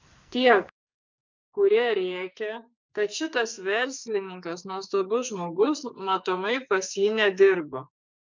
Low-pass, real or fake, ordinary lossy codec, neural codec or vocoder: 7.2 kHz; fake; MP3, 64 kbps; codec, 44.1 kHz, 2.6 kbps, SNAC